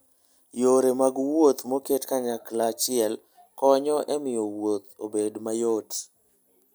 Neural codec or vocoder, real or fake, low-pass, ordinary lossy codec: none; real; none; none